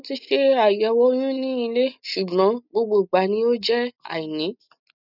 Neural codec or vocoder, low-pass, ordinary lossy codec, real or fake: none; 5.4 kHz; none; real